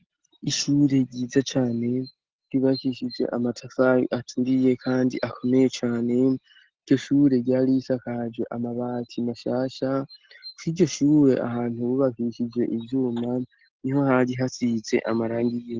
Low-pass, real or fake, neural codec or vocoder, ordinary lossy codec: 7.2 kHz; real; none; Opus, 16 kbps